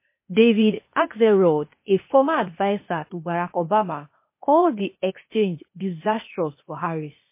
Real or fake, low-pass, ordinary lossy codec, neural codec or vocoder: fake; 3.6 kHz; MP3, 24 kbps; codec, 16 kHz, 0.8 kbps, ZipCodec